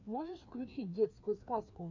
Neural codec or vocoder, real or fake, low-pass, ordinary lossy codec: codec, 16 kHz, 2 kbps, FreqCodec, larger model; fake; 7.2 kHz; MP3, 64 kbps